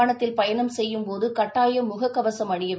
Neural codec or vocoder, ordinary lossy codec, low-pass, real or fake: none; none; none; real